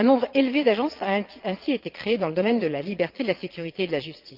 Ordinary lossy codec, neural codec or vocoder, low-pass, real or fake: Opus, 24 kbps; vocoder, 22.05 kHz, 80 mel bands, Vocos; 5.4 kHz; fake